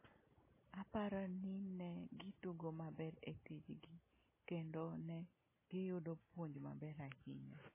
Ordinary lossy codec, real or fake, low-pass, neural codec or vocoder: MP3, 16 kbps; real; 3.6 kHz; none